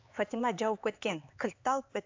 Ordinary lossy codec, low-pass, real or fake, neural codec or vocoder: AAC, 48 kbps; 7.2 kHz; fake; codec, 16 kHz, 4 kbps, X-Codec, HuBERT features, trained on LibriSpeech